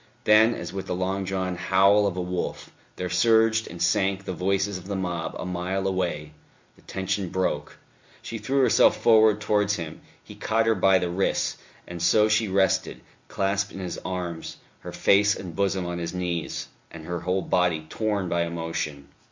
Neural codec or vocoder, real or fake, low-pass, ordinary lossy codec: none; real; 7.2 kHz; MP3, 64 kbps